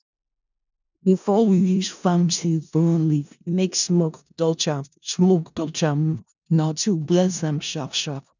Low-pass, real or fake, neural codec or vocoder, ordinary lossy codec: 7.2 kHz; fake; codec, 16 kHz in and 24 kHz out, 0.4 kbps, LongCat-Audio-Codec, four codebook decoder; none